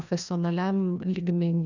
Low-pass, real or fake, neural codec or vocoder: 7.2 kHz; fake; codec, 16 kHz, 1 kbps, FreqCodec, larger model